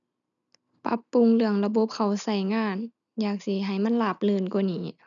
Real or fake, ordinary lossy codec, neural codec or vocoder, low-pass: real; none; none; 7.2 kHz